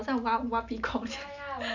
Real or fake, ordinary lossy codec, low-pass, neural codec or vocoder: real; none; 7.2 kHz; none